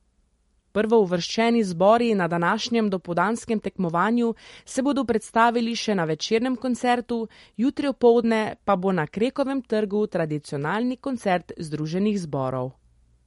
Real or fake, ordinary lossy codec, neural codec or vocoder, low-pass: real; MP3, 48 kbps; none; 19.8 kHz